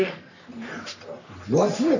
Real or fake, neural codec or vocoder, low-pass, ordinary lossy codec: fake; codec, 44.1 kHz, 3.4 kbps, Pupu-Codec; 7.2 kHz; none